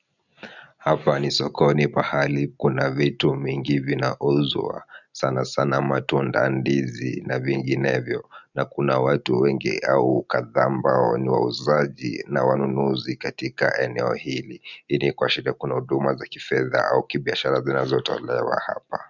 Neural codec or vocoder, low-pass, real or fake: none; 7.2 kHz; real